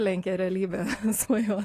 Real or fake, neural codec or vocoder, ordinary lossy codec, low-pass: real; none; AAC, 48 kbps; 14.4 kHz